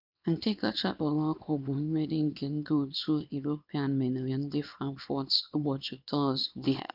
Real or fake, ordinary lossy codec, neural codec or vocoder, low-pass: fake; none; codec, 24 kHz, 0.9 kbps, WavTokenizer, small release; 5.4 kHz